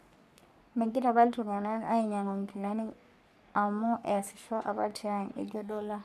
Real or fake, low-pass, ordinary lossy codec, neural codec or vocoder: fake; 14.4 kHz; none; codec, 44.1 kHz, 3.4 kbps, Pupu-Codec